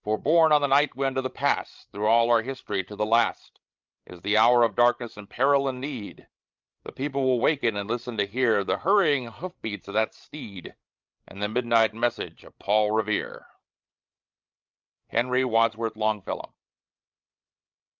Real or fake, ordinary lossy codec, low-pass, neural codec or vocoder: fake; Opus, 32 kbps; 7.2 kHz; codec, 16 kHz, 16 kbps, FreqCodec, larger model